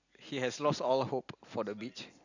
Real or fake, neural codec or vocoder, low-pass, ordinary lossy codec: real; none; 7.2 kHz; none